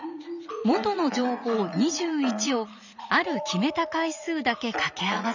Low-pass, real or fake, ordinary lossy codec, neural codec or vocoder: 7.2 kHz; fake; none; vocoder, 44.1 kHz, 80 mel bands, Vocos